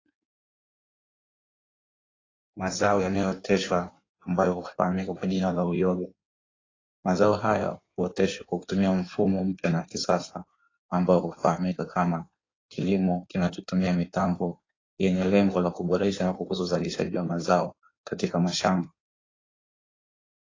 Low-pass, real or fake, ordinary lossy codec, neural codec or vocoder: 7.2 kHz; fake; AAC, 32 kbps; codec, 16 kHz in and 24 kHz out, 1.1 kbps, FireRedTTS-2 codec